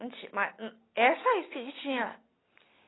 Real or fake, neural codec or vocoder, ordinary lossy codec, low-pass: real; none; AAC, 16 kbps; 7.2 kHz